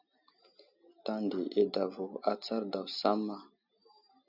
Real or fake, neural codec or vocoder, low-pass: real; none; 5.4 kHz